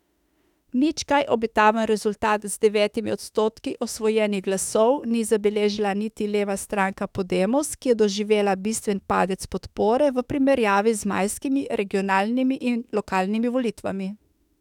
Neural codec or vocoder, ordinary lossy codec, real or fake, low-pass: autoencoder, 48 kHz, 32 numbers a frame, DAC-VAE, trained on Japanese speech; none; fake; 19.8 kHz